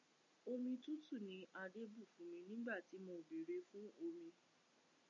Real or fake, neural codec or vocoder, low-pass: real; none; 7.2 kHz